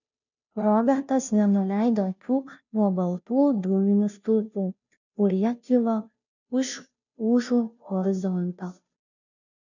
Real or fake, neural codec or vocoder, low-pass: fake; codec, 16 kHz, 0.5 kbps, FunCodec, trained on Chinese and English, 25 frames a second; 7.2 kHz